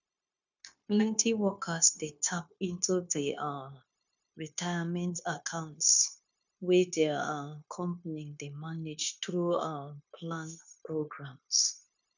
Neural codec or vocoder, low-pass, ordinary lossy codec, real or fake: codec, 16 kHz, 0.9 kbps, LongCat-Audio-Codec; 7.2 kHz; none; fake